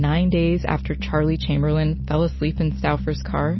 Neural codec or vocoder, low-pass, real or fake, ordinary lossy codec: none; 7.2 kHz; real; MP3, 24 kbps